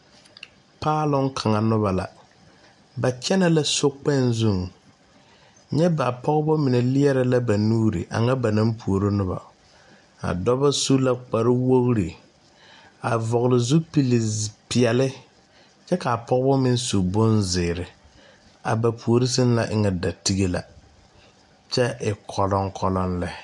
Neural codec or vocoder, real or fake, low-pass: none; real; 10.8 kHz